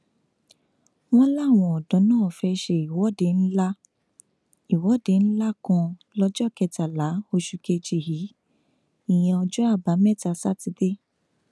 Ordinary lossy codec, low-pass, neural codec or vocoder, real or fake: none; none; none; real